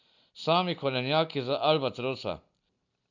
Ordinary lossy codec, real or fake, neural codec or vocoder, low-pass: none; real; none; 7.2 kHz